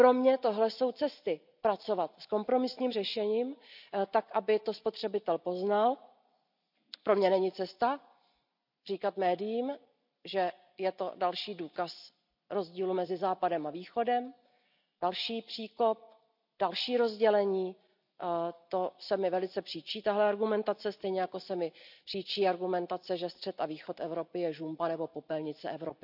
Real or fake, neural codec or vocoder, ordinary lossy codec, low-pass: real; none; none; 5.4 kHz